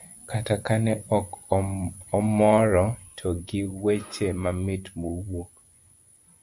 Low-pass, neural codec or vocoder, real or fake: 10.8 kHz; vocoder, 24 kHz, 100 mel bands, Vocos; fake